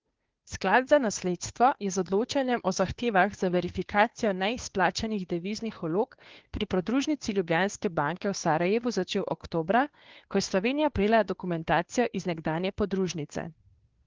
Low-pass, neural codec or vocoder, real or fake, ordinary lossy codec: 7.2 kHz; codec, 16 kHz, 2 kbps, FunCodec, trained on Chinese and English, 25 frames a second; fake; Opus, 16 kbps